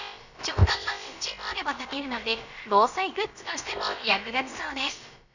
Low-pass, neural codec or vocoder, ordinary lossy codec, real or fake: 7.2 kHz; codec, 16 kHz, about 1 kbps, DyCAST, with the encoder's durations; none; fake